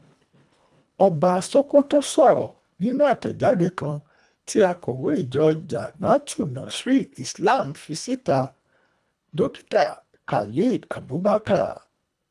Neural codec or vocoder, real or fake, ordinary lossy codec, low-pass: codec, 24 kHz, 1.5 kbps, HILCodec; fake; none; none